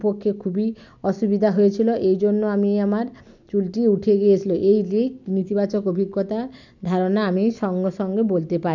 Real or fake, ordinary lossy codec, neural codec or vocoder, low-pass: real; none; none; 7.2 kHz